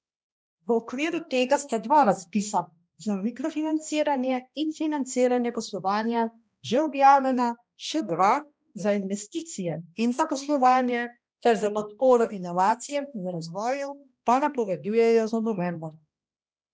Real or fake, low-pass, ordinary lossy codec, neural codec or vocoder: fake; none; none; codec, 16 kHz, 1 kbps, X-Codec, HuBERT features, trained on balanced general audio